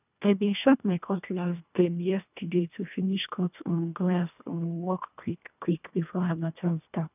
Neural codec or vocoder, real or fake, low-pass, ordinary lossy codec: codec, 24 kHz, 1.5 kbps, HILCodec; fake; 3.6 kHz; none